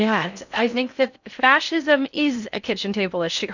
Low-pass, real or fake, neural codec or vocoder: 7.2 kHz; fake; codec, 16 kHz in and 24 kHz out, 0.6 kbps, FocalCodec, streaming, 2048 codes